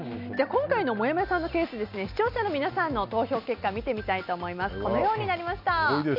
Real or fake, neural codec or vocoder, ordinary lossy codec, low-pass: real; none; none; 5.4 kHz